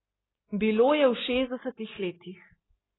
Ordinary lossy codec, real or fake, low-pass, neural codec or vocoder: AAC, 16 kbps; real; 7.2 kHz; none